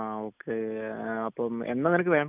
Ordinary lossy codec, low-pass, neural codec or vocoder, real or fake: none; 3.6 kHz; none; real